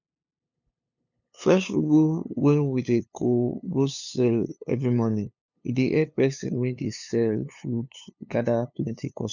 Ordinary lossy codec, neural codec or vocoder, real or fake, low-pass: none; codec, 16 kHz, 2 kbps, FunCodec, trained on LibriTTS, 25 frames a second; fake; 7.2 kHz